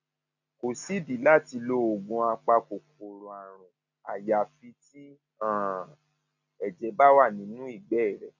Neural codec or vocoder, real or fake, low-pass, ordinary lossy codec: none; real; 7.2 kHz; none